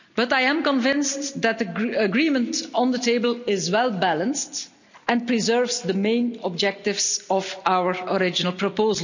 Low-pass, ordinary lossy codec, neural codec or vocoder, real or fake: 7.2 kHz; none; none; real